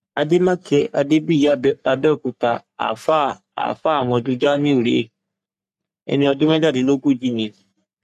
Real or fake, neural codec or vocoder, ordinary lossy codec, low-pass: fake; codec, 44.1 kHz, 3.4 kbps, Pupu-Codec; none; 14.4 kHz